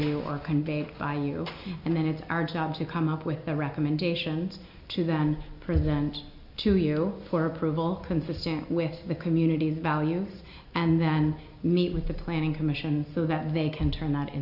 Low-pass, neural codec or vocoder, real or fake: 5.4 kHz; none; real